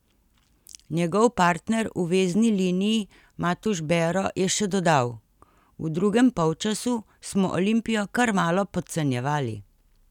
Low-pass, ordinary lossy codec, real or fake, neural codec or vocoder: 19.8 kHz; none; real; none